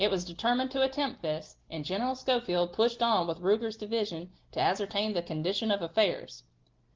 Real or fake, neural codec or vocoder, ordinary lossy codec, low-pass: real; none; Opus, 16 kbps; 7.2 kHz